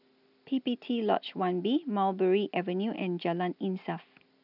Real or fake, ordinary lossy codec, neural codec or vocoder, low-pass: real; none; none; 5.4 kHz